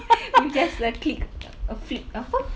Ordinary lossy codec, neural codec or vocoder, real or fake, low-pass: none; none; real; none